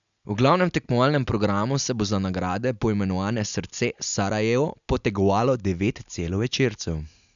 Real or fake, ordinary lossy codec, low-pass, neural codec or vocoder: real; none; 7.2 kHz; none